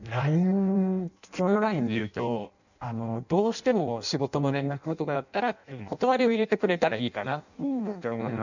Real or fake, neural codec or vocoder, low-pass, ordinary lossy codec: fake; codec, 16 kHz in and 24 kHz out, 0.6 kbps, FireRedTTS-2 codec; 7.2 kHz; none